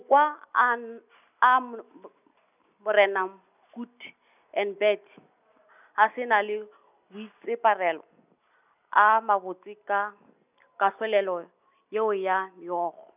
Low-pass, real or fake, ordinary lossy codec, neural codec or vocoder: 3.6 kHz; real; none; none